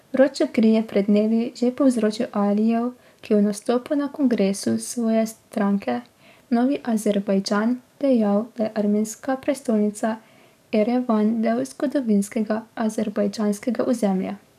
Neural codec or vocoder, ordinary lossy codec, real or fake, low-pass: codec, 44.1 kHz, 7.8 kbps, DAC; none; fake; 14.4 kHz